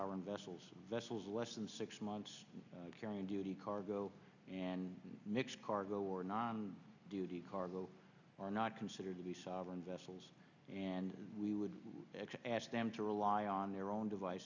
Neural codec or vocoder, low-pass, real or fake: none; 7.2 kHz; real